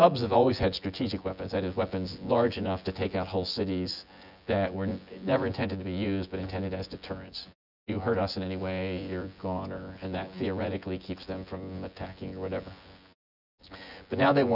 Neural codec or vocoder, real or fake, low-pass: vocoder, 24 kHz, 100 mel bands, Vocos; fake; 5.4 kHz